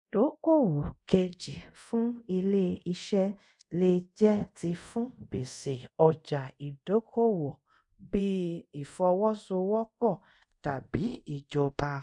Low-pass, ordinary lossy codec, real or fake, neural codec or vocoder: 10.8 kHz; none; fake; codec, 24 kHz, 0.5 kbps, DualCodec